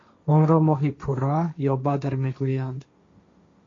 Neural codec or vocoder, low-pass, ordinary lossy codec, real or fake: codec, 16 kHz, 1.1 kbps, Voila-Tokenizer; 7.2 kHz; MP3, 48 kbps; fake